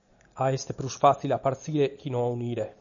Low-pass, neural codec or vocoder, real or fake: 7.2 kHz; none; real